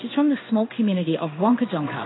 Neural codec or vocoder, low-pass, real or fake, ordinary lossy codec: codec, 16 kHz in and 24 kHz out, 1 kbps, XY-Tokenizer; 7.2 kHz; fake; AAC, 16 kbps